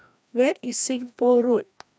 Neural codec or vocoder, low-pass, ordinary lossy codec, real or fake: codec, 16 kHz, 1 kbps, FreqCodec, larger model; none; none; fake